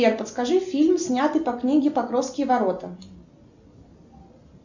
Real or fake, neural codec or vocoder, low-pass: real; none; 7.2 kHz